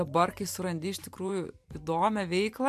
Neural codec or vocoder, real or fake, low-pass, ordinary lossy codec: vocoder, 44.1 kHz, 128 mel bands every 512 samples, BigVGAN v2; fake; 14.4 kHz; MP3, 96 kbps